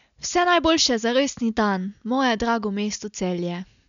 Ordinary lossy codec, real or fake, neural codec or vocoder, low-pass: none; real; none; 7.2 kHz